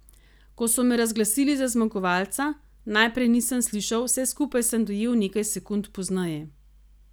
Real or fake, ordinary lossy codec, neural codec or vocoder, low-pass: real; none; none; none